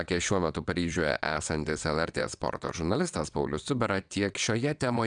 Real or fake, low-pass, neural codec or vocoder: fake; 9.9 kHz; vocoder, 22.05 kHz, 80 mel bands, WaveNeXt